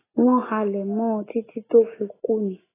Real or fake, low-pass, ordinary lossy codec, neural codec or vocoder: real; 3.6 kHz; AAC, 16 kbps; none